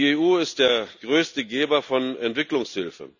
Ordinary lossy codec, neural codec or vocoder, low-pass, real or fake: none; none; 7.2 kHz; real